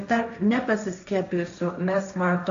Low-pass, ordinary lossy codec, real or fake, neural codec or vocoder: 7.2 kHz; AAC, 64 kbps; fake; codec, 16 kHz, 1.1 kbps, Voila-Tokenizer